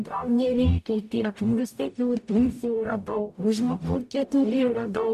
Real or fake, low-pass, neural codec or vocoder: fake; 14.4 kHz; codec, 44.1 kHz, 0.9 kbps, DAC